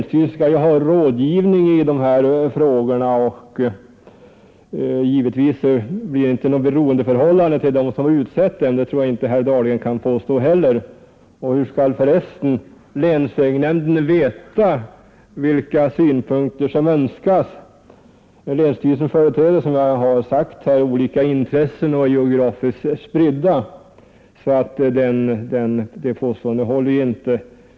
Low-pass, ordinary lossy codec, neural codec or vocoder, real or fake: none; none; none; real